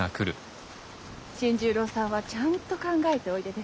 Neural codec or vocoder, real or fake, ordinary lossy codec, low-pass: none; real; none; none